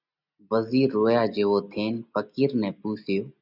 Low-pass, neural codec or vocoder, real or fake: 5.4 kHz; none; real